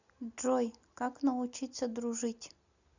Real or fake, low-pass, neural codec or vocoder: real; 7.2 kHz; none